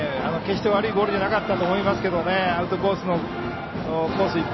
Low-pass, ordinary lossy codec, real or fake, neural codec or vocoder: 7.2 kHz; MP3, 24 kbps; real; none